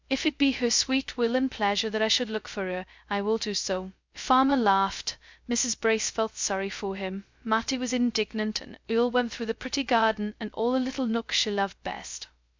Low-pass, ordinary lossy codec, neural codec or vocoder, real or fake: 7.2 kHz; MP3, 64 kbps; codec, 16 kHz, 0.2 kbps, FocalCodec; fake